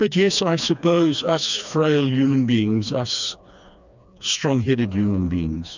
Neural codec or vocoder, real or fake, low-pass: codec, 16 kHz, 2 kbps, FreqCodec, smaller model; fake; 7.2 kHz